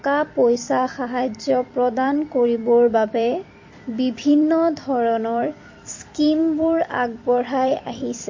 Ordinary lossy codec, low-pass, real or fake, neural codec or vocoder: MP3, 32 kbps; 7.2 kHz; fake; vocoder, 44.1 kHz, 128 mel bands every 256 samples, BigVGAN v2